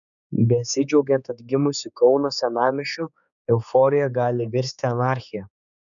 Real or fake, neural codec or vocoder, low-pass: fake; codec, 16 kHz, 4 kbps, X-Codec, HuBERT features, trained on general audio; 7.2 kHz